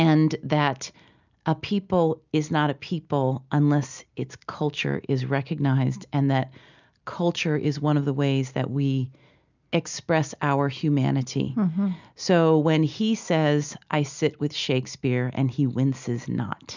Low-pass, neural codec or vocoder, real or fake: 7.2 kHz; none; real